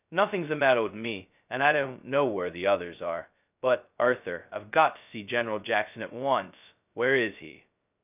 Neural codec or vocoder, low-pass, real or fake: codec, 16 kHz, 0.2 kbps, FocalCodec; 3.6 kHz; fake